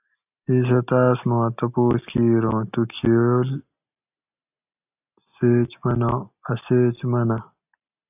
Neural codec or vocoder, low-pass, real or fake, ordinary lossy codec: none; 3.6 kHz; real; AAC, 32 kbps